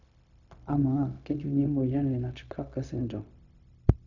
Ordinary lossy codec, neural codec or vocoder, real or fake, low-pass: none; codec, 16 kHz, 0.4 kbps, LongCat-Audio-Codec; fake; 7.2 kHz